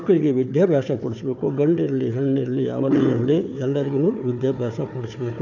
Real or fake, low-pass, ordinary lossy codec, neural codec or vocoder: fake; 7.2 kHz; none; codec, 16 kHz, 4 kbps, FunCodec, trained on Chinese and English, 50 frames a second